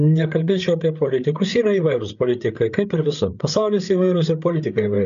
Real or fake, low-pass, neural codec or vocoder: fake; 7.2 kHz; codec, 16 kHz, 8 kbps, FreqCodec, larger model